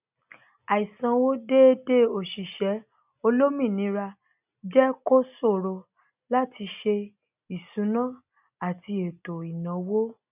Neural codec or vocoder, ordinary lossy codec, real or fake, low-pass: none; none; real; 3.6 kHz